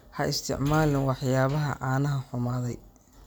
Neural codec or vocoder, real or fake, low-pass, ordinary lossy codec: none; real; none; none